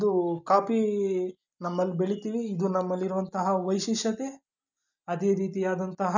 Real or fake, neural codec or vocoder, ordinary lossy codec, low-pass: real; none; none; 7.2 kHz